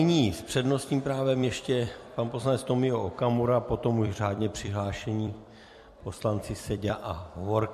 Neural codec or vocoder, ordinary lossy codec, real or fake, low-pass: vocoder, 44.1 kHz, 128 mel bands every 256 samples, BigVGAN v2; MP3, 64 kbps; fake; 14.4 kHz